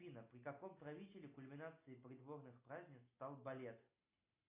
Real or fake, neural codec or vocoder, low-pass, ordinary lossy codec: real; none; 3.6 kHz; AAC, 24 kbps